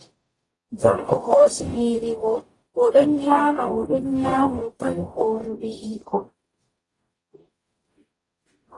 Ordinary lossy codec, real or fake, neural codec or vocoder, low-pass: AAC, 32 kbps; fake; codec, 44.1 kHz, 0.9 kbps, DAC; 10.8 kHz